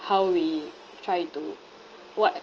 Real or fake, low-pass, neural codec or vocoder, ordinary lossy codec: real; 7.2 kHz; none; Opus, 24 kbps